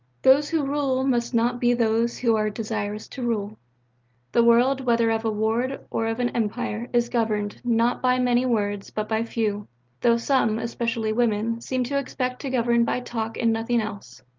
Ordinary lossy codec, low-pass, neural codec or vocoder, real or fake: Opus, 32 kbps; 7.2 kHz; none; real